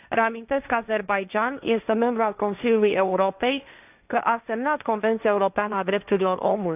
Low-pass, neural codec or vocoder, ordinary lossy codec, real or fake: 3.6 kHz; codec, 16 kHz, 1.1 kbps, Voila-Tokenizer; none; fake